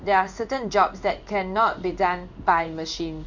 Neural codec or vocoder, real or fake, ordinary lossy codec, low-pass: codec, 16 kHz in and 24 kHz out, 1 kbps, XY-Tokenizer; fake; none; 7.2 kHz